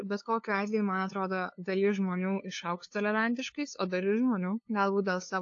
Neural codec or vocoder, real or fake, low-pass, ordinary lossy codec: codec, 16 kHz, 2 kbps, FunCodec, trained on LibriTTS, 25 frames a second; fake; 7.2 kHz; AAC, 48 kbps